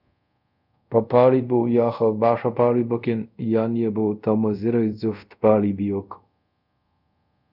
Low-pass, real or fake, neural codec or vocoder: 5.4 kHz; fake; codec, 24 kHz, 0.5 kbps, DualCodec